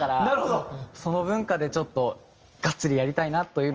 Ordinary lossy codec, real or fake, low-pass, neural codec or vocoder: Opus, 24 kbps; real; 7.2 kHz; none